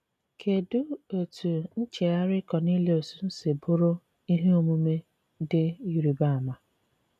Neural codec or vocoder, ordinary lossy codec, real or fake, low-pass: none; none; real; 14.4 kHz